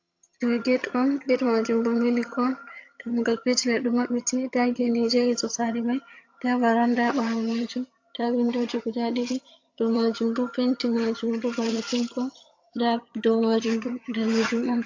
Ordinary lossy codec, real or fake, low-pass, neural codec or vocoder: AAC, 48 kbps; fake; 7.2 kHz; vocoder, 22.05 kHz, 80 mel bands, HiFi-GAN